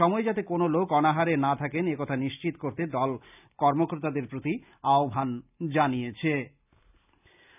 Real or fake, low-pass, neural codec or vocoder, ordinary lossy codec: real; 3.6 kHz; none; none